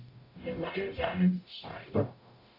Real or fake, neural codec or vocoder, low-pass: fake; codec, 44.1 kHz, 0.9 kbps, DAC; 5.4 kHz